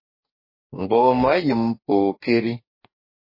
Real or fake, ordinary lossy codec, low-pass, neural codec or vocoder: fake; MP3, 24 kbps; 5.4 kHz; codec, 44.1 kHz, 2.6 kbps, DAC